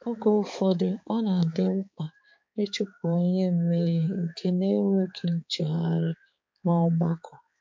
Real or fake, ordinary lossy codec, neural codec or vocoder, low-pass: fake; MP3, 48 kbps; codec, 16 kHz, 4 kbps, X-Codec, HuBERT features, trained on balanced general audio; 7.2 kHz